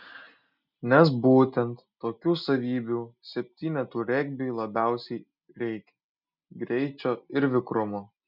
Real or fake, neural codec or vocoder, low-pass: real; none; 5.4 kHz